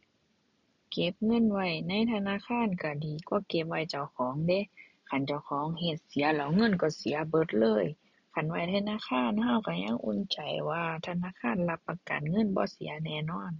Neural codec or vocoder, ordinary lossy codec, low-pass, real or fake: none; none; 7.2 kHz; real